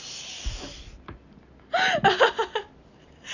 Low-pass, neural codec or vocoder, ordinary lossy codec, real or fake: 7.2 kHz; none; none; real